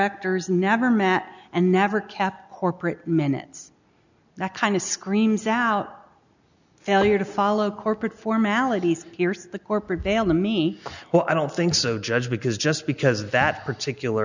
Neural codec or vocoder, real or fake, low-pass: none; real; 7.2 kHz